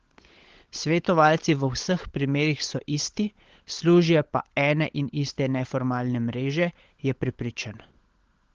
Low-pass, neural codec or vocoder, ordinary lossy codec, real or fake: 7.2 kHz; codec, 16 kHz, 16 kbps, FunCodec, trained on LibriTTS, 50 frames a second; Opus, 16 kbps; fake